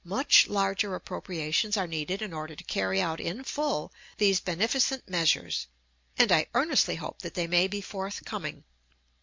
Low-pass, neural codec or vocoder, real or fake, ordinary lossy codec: 7.2 kHz; none; real; MP3, 48 kbps